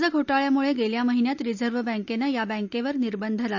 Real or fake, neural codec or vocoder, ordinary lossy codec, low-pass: real; none; none; 7.2 kHz